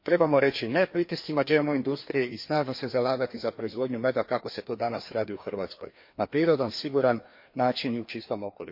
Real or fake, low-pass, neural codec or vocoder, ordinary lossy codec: fake; 5.4 kHz; codec, 16 kHz, 2 kbps, FreqCodec, larger model; MP3, 32 kbps